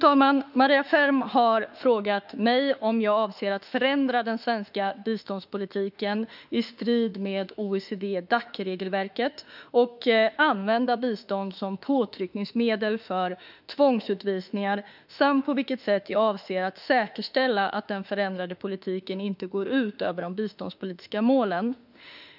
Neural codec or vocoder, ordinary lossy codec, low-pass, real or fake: autoencoder, 48 kHz, 32 numbers a frame, DAC-VAE, trained on Japanese speech; none; 5.4 kHz; fake